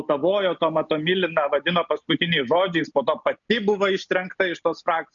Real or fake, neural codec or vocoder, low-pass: real; none; 7.2 kHz